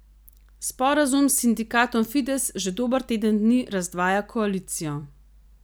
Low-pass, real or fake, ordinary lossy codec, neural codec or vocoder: none; real; none; none